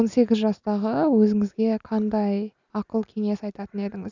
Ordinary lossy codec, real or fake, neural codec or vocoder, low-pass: none; real; none; 7.2 kHz